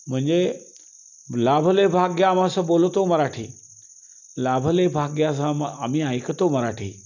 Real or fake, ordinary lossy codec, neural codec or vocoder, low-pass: real; none; none; 7.2 kHz